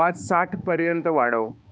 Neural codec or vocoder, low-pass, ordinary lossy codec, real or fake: codec, 16 kHz, 2 kbps, X-Codec, HuBERT features, trained on balanced general audio; none; none; fake